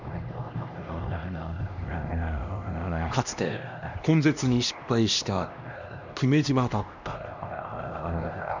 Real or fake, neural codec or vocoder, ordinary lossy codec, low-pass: fake; codec, 16 kHz, 1 kbps, X-Codec, HuBERT features, trained on LibriSpeech; none; 7.2 kHz